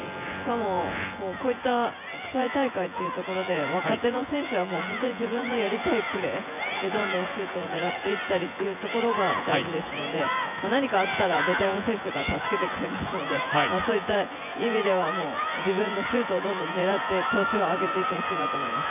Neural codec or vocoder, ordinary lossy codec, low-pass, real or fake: vocoder, 24 kHz, 100 mel bands, Vocos; none; 3.6 kHz; fake